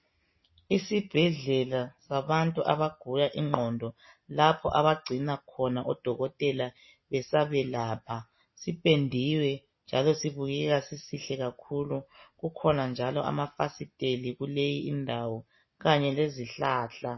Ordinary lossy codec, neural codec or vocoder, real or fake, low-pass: MP3, 24 kbps; none; real; 7.2 kHz